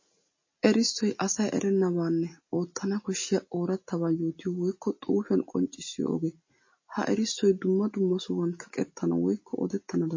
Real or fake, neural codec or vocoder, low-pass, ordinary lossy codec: real; none; 7.2 kHz; MP3, 32 kbps